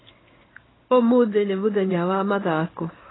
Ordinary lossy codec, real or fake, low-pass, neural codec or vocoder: AAC, 16 kbps; fake; 7.2 kHz; codec, 16 kHz in and 24 kHz out, 1 kbps, XY-Tokenizer